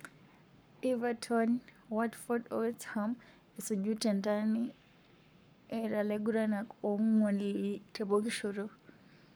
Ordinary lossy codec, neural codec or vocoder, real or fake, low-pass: none; codec, 44.1 kHz, 7.8 kbps, Pupu-Codec; fake; none